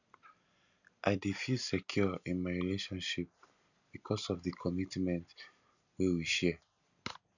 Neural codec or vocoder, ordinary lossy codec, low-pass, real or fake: none; none; 7.2 kHz; real